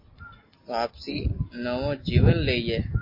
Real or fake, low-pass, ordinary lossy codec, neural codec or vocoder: real; 5.4 kHz; MP3, 32 kbps; none